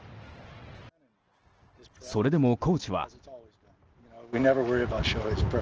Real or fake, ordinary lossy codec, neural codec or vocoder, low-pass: real; Opus, 16 kbps; none; 7.2 kHz